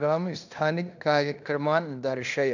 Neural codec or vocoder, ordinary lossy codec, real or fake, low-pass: codec, 16 kHz in and 24 kHz out, 0.9 kbps, LongCat-Audio-Codec, fine tuned four codebook decoder; none; fake; 7.2 kHz